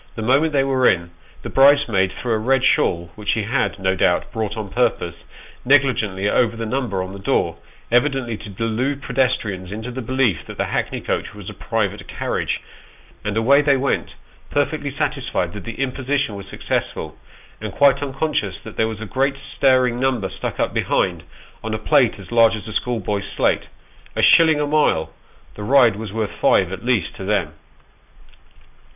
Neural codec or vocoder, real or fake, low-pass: none; real; 3.6 kHz